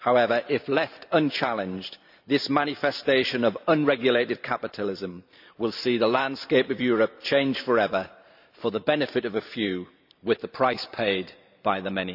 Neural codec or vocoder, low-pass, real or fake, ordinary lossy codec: vocoder, 44.1 kHz, 128 mel bands every 512 samples, BigVGAN v2; 5.4 kHz; fake; none